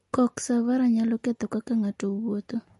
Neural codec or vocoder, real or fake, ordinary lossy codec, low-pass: none; real; MP3, 48 kbps; 14.4 kHz